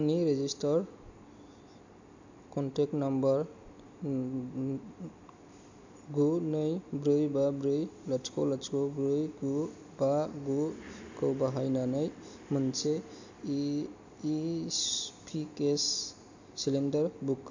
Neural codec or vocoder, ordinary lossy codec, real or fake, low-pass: none; AAC, 48 kbps; real; 7.2 kHz